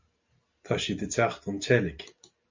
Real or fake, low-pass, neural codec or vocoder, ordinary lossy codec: real; 7.2 kHz; none; MP3, 64 kbps